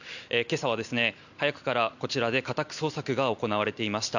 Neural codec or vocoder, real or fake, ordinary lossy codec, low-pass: none; real; none; 7.2 kHz